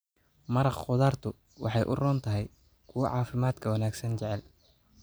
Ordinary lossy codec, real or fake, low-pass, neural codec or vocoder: none; real; none; none